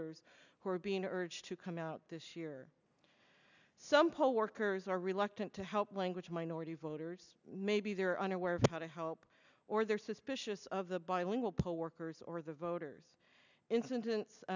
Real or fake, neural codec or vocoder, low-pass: real; none; 7.2 kHz